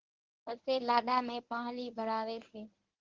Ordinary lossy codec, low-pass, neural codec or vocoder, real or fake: Opus, 16 kbps; 7.2 kHz; codec, 24 kHz, 0.9 kbps, WavTokenizer, medium speech release version 1; fake